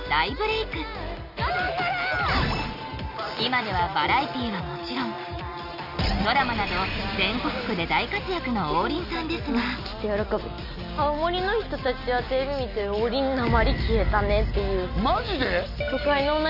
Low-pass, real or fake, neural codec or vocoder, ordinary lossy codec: 5.4 kHz; real; none; none